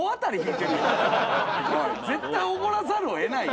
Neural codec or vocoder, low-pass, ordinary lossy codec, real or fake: none; none; none; real